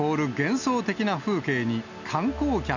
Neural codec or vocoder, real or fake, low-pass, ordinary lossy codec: none; real; 7.2 kHz; none